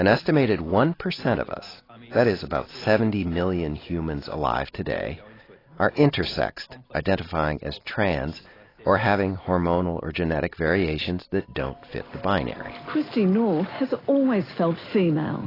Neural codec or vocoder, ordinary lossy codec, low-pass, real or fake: none; AAC, 24 kbps; 5.4 kHz; real